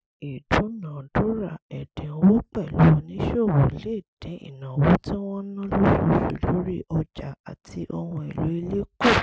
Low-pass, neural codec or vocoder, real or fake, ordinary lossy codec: none; none; real; none